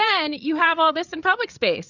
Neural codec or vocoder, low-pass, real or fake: codec, 16 kHz, 8 kbps, FreqCodec, larger model; 7.2 kHz; fake